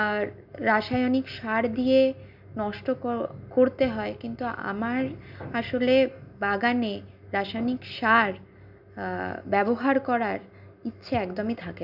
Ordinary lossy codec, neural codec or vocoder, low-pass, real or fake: none; none; 5.4 kHz; real